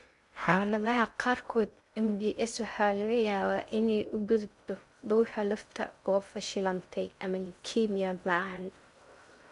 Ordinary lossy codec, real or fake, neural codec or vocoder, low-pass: MP3, 96 kbps; fake; codec, 16 kHz in and 24 kHz out, 0.6 kbps, FocalCodec, streaming, 2048 codes; 10.8 kHz